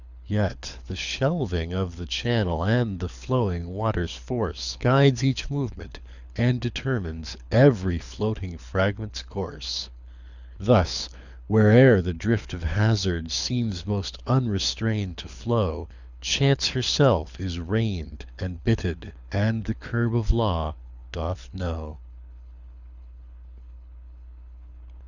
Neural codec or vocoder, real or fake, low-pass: codec, 24 kHz, 6 kbps, HILCodec; fake; 7.2 kHz